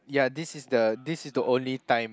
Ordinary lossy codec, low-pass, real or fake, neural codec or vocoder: none; none; real; none